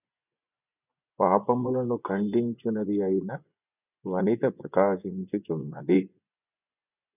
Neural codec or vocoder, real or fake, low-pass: vocoder, 24 kHz, 100 mel bands, Vocos; fake; 3.6 kHz